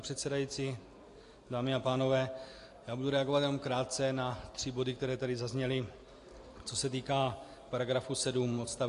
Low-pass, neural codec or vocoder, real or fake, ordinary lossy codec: 10.8 kHz; none; real; AAC, 48 kbps